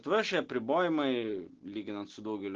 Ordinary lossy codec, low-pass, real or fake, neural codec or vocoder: Opus, 16 kbps; 7.2 kHz; real; none